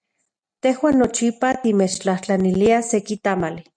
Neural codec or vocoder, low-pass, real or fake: none; 9.9 kHz; real